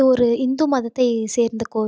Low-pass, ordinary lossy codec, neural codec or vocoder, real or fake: none; none; none; real